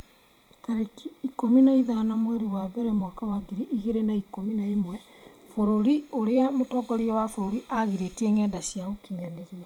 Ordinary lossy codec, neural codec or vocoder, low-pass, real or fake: MP3, 96 kbps; vocoder, 44.1 kHz, 128 mel bands every 512 samples, BigVGAN v2; 19.8 kHz; fake